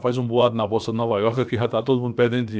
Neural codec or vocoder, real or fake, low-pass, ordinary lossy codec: codec, 16 kHz, about 1 kbps, DyCAST, with the encoder's durations; fake; none; none